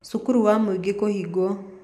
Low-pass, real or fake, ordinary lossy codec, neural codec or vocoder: 14.4 kHz; real; none; none